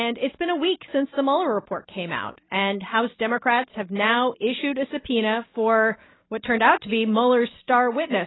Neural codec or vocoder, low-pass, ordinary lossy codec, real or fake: none; 7.2 kHz; AAC, 16 kbps; real